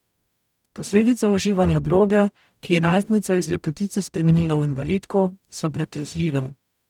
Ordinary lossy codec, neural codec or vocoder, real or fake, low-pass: none; codec, 44.1 kHz, 0.9 kbps, DAC; fake; 19.8 kHz